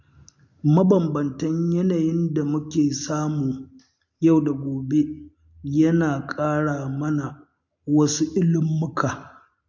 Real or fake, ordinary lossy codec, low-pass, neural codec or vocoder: real; MP3, 48 kbps; 7.2 kHz; none